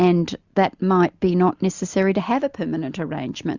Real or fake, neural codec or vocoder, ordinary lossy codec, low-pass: real; none; Opus, 64 kbps; 7.2 kHz